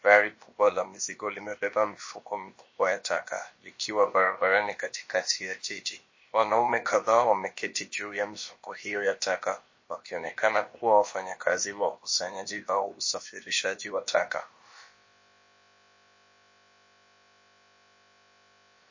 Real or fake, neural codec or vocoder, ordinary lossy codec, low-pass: fake; codec, 16 kHz, about 1 kbps, DyCAST, with the encoder's durations; MP3, 32 kbps; 7.2 kHz